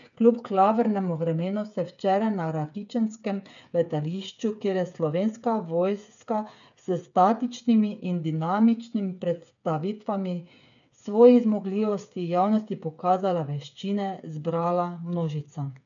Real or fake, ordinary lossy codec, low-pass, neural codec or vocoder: fake; none; 7.2 kHz; codec, 16 kHz, 8 kbps, FreqCodec, smaller model